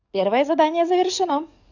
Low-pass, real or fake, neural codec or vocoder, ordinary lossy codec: 7.2 kHz; fake; codec, 44.1 kHz, 7.8 kbps, DAC; none